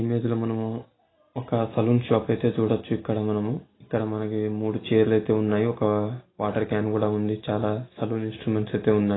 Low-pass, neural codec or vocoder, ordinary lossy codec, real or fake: 7.2 kHz; none; AAC, 16 kbps; real